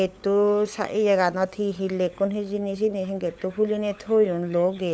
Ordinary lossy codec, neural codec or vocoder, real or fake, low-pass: none; codec, 16 kHz, 16 kbps, FunCodec, trained on LibriTTS, 50 frames a second; fake; none